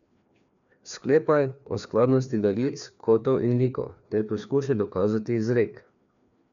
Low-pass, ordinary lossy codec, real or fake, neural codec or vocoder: 7.2 kHz; none; fake; codec, 16 kHz, 2 kbps, FreqCodec, larger model